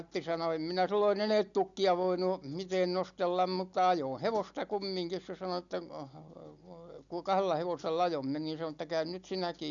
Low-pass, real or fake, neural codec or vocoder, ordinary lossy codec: 7.2 kHz; real; none; none